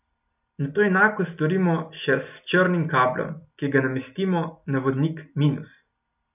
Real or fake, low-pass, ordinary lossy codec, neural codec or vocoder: real; 3.6 kHz; none; none